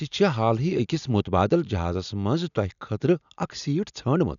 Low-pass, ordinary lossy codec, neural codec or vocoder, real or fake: 7.2 kHz; none; none; real